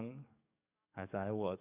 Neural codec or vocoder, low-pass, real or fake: codec, 44.1 kHz, 7.8 kbps, DAC; 3.6 kHz; fake